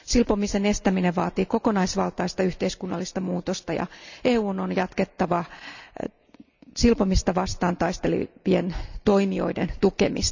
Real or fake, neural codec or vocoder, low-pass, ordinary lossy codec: real; none; 7.2 kHz; none